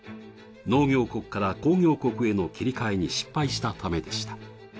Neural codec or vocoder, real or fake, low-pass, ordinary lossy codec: none; real; none; none